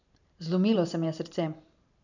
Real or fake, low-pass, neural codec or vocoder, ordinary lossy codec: fake; 7.2 kHz; vocoder, 44.1 kHz, 128 mel bands every 512 samples, BigVGAN v2; none